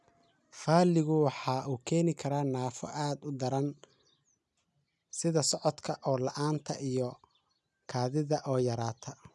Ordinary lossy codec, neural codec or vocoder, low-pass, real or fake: none; none; none; real